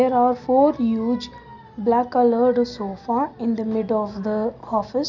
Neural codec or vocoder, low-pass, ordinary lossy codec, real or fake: none; 7.2 kHz; none; real